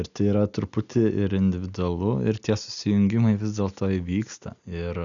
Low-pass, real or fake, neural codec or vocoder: 7.2 kHz; real; none